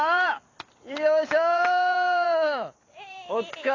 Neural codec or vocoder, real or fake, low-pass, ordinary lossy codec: vocoder, 44.1 kHz, 128 mel bands every 256 samples, BigVGAN v2; fake; 7.2 kHz; AAC, 32 kbps